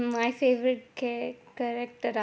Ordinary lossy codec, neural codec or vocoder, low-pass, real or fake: none; none; none; real